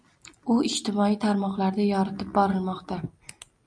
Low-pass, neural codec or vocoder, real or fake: 9.9 kHz; none; real